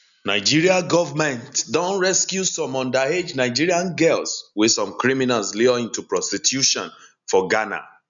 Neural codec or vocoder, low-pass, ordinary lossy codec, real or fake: none; 7.2 kHz; none; real